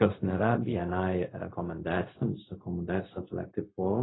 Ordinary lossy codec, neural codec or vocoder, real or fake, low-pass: AAC, 16 kbps; codec, 16 kHz, 0.4 kbps, LongCat-Audio-Codec; fake; 7.2 kHz